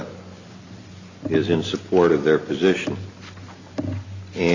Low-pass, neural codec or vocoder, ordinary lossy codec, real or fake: 7.2 kHz; none; Opus, 64 kbps; real